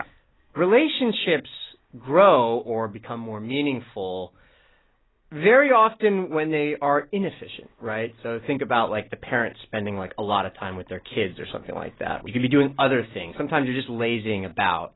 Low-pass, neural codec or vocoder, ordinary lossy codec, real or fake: 7.2 kHz; none; AAC, 16 kbps; real